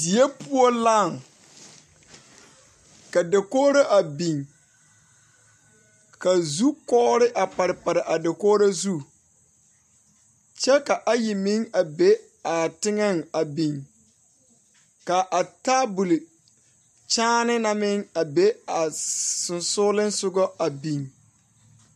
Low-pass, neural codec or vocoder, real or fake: 14.4 kHz; none; real